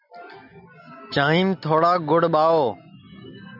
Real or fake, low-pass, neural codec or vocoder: real; 5.4 kHz; none